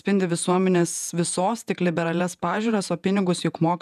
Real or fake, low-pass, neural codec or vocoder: fake; 14.4 kHz; vocoder, 48 kHz, 128 mel bands, Vocos